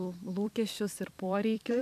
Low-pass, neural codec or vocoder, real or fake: 14.4 kHz; vocoder, 44.1 kHz, 128 mel bands every 256 samples, BigVGAN v2; fake